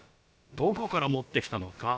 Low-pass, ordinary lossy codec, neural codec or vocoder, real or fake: none; none; codec, 16 kHz, about 1 kbps, DyCAST, with the encoder's durations; fake